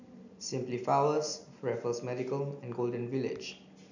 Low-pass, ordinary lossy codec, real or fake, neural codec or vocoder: 7.2 kHz; none; real; none